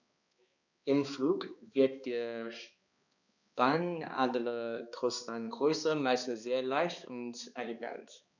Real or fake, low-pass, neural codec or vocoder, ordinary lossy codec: fake; 7.2 kHz; codec, 16 kHz, 2 kbps, X-Codec, HuBERT features, trained on balanced general audio; none